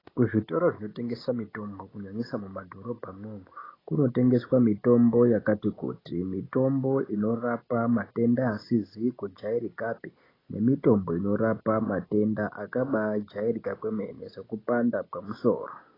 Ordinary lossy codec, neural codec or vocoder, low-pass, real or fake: AAC, 24 kbps; none; 5.4 kHz; real